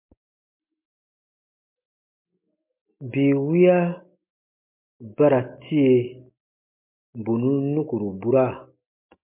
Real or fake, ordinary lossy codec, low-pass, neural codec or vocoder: real; MP3, 32 kbps; 3.6 kHz; none